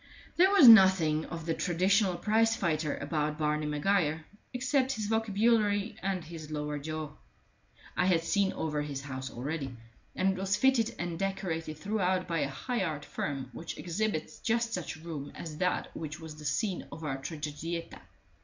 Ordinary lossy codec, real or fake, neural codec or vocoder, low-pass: MP3, 64 kbps; real; none; 7.2 kHz